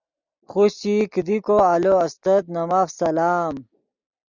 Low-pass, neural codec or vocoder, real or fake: 7.2 kHz; none; real